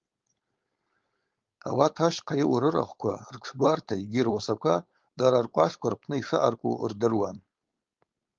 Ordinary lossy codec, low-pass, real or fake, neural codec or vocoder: Opus, 32 kbps; 7.2 kHz; fake; codec, 16 kHz, 4.8 kbps, FACodec